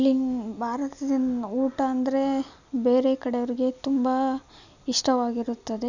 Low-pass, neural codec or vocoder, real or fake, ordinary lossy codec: 7.2 kHz; none; real; none